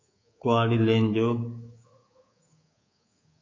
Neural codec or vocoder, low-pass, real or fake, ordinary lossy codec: codec, 24 kHz, 3.1 kbps, DualCodec; 7.2 kHz; fake; AAC, 32 kbps